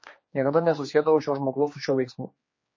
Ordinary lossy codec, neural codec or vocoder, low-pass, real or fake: MP3, 32 kbps; codec, 16 kHz, 2 kbps, X-Codec, HuBERT features, trained on general audio; 7.2 kHz; fake